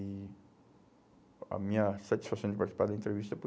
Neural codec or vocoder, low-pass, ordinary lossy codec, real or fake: none; none; none; real